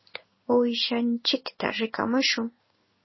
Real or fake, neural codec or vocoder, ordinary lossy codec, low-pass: fake; codec, 16 kHz in and 24 kHz out, 1 kbps, XY-Tokenizer; MP3, 24 kbps; 7.2 kHz